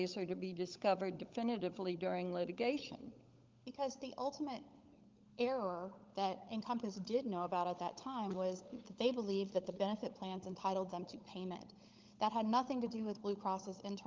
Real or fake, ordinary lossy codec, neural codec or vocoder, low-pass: fake; Opus, 32 kbps; codec, 16 kHz, 16 kbps, FunCodec, trained on LibriTTS, 50 frames a second; 7.2 kHz